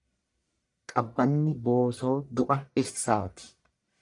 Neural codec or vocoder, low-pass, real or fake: codec, 44.1 kHz, 1.7 kbps, Pupu-Codec; 10.8 kHz; fake